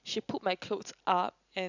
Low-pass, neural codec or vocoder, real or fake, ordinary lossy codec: 7.2 kHz; none; real; none